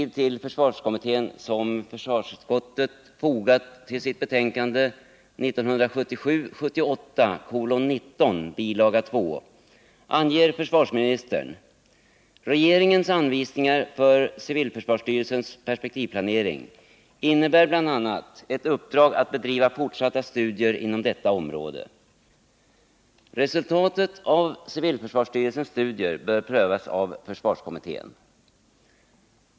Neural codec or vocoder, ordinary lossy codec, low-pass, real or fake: none; none; none; real